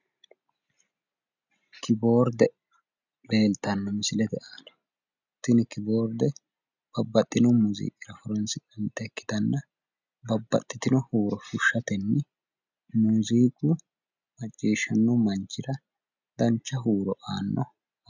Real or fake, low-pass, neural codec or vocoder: real; 7.2 kHz; none